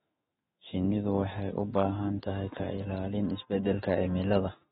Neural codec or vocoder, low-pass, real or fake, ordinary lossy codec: autoencoder, 48 kHz, 128 numbers a frame, DAC-VAE, trained on Japanese speech; 19.8 kHz; fake; AAC, 16 kbps